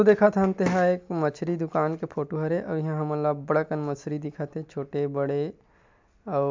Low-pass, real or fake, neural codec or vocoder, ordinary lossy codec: 7.2 kHz; real; none; MP3, 64 kbps